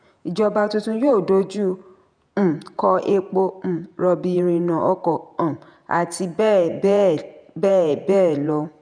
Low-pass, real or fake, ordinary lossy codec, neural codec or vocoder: 9.9 kHz; fake; none; vocoder, 48 kHz, 128 mel bands, Vocos